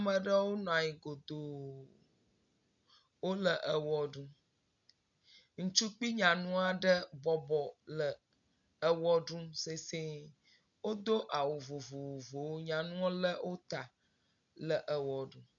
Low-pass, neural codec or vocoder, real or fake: 7.2 kHz; none; real